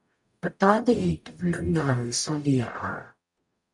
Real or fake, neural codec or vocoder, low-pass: fake; codec, 44.1 kHz, 0.9 kbps, DAC; 10.8 kHz